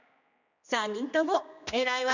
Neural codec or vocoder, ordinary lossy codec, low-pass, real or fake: codec, 16 kHz, 2 kbps, X-Codec, HuBERT features, trained on general audio; none; 7.2 kHz; fake